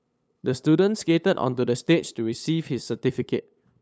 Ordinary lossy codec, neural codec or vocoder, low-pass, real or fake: none; codec, 16 kHz, 8 kbps, FunCodec, trained on LibriTTS, 25 frames a second; none; fake